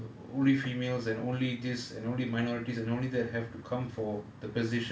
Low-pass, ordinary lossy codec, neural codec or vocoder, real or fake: none; none; none; real